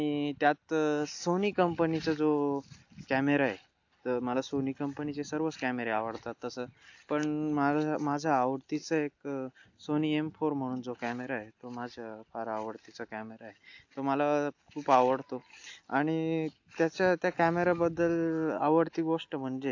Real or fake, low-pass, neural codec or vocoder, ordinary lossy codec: real; 7.2 kHz; none; AAC, 48 kbps